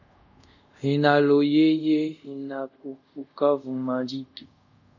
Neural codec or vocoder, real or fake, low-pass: codec, 24 kHz, 0.5 kbps, DualCodec; fake; 7.2 kHz